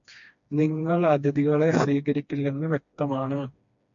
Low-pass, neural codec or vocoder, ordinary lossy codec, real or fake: 7.2 kHz; codec, 16 kHz, 2 kbps, FreqCodec, smaller model; MP3, 48 kbps; fake